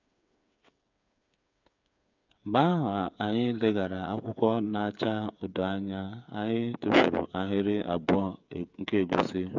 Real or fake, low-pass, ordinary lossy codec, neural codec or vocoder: fake; 7.2 kHz; none; codec, 16 kHz, 8 kbps, FreqCodec, smaller model